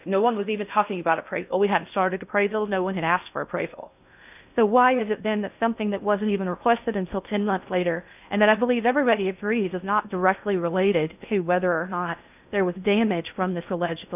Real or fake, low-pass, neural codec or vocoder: fake; 3.6 kHz; codec, 16 kHz in and 24 kHz out, 0.6 kbps, FocalCodec, streaming, 4096 codes